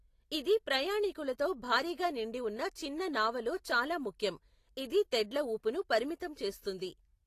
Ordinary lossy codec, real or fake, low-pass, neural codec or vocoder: AAC, 48 kbps; real; 14.4 kHz; none